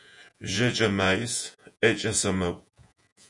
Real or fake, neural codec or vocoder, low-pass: fake; vocoder, 48 kHz, 128 mel bands, Vocos; 10.8 kHz